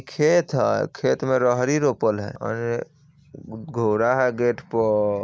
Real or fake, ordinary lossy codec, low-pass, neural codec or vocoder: real; none; none; none